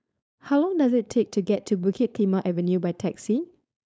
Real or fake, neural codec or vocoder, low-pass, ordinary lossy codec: fake; codec, 16 kHz, 4.8 kbps, FACodec; none; none